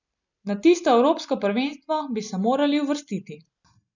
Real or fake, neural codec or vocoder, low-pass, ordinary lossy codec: real; none; 7.2 kHz; none